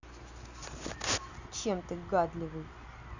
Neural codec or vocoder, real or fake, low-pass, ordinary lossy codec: none; real; 7.2 kHz; none